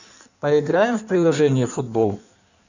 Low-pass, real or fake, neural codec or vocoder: 7.2 kHz; fake; codec, 16 kHz in and 24 kHz out, 1.1 kbps, FireRedTTS-2 codec